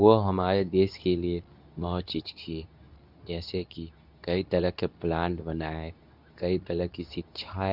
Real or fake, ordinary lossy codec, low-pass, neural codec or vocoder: fake; none; 5.4 kHz; codec, 24 kHz, 0.9 kbps, WavTokenizer, medium speech release version 2